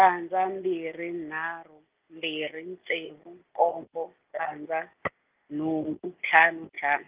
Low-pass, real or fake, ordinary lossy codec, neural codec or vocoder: 3.6 kHz; real; Opus, 24 kbps; none